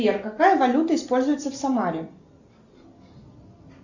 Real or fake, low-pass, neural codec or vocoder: real; 7.2 kHz; none